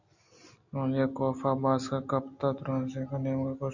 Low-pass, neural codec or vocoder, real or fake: 7.2 kHz; none; real